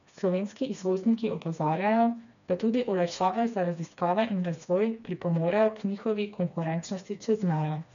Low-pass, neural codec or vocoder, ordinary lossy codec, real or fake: 7.2 kHz; codec, 16 kHz, 2 kbps, FreqCodec, smaller model; none; fake